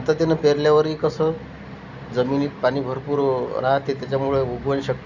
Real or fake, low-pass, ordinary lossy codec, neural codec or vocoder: real; 7.2 kHz; none; none